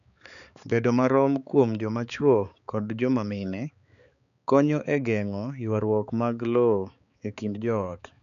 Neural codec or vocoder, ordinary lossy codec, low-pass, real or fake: codec, 16 kHz, 4 kbps, X-Codec, HuBERT features, trained on balanced general audio; none; 7.2 kHz; fake